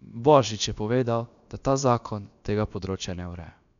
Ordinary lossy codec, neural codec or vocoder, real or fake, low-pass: none; codec, 16 kHz, about 1 kbps, DyCAST, with the encoder's durations; fake; 7.2 kHz